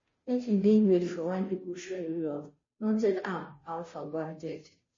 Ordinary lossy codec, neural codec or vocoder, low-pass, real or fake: MP3, 32 kbps; codec, 16 kHz, 0.5 kbps, FunCodec, trained on Chinese and English, 25 frames a second; 7.2 kHz; fake